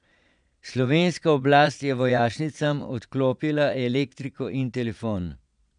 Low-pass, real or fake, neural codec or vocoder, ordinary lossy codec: 9.9 kHz; fake; vocoder, 22.05 kHz, 80 mel bands, Vocos; none